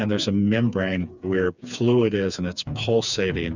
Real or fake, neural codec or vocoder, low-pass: fake; codec, 16 kHz, 4 kbps, FreqCodec, smaller model; 7.2 kHz